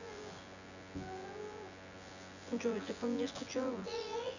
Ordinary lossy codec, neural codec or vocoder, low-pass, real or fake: none; vocoder, 24 kHz, 100 mel bands, Vocos; 7.2 kHz; fake